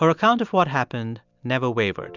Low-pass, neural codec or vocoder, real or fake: 7.2 kHz; none; real